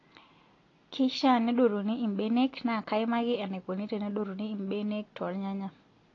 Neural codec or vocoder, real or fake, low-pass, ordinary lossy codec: none; real; 7.2 kHz; AAC, 32 kbps